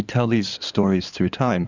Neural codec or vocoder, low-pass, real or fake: codec, 16 kHz in and 24 kHz out, 2.2 kbps, FireRedTTS-2 codec; 7.2 kHz; fake